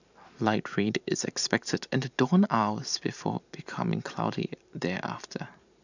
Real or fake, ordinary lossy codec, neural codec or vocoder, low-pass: fake; none; vocoder, 44.1 kHz, 128 mel bands every 512 samples, BigVGAN v2; 7.2 kHz